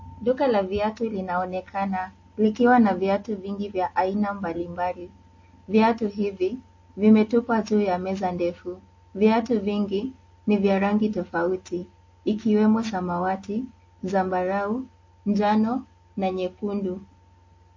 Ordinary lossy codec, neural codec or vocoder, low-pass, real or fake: MP3, 32 kbps; none; 7.2 kHz; real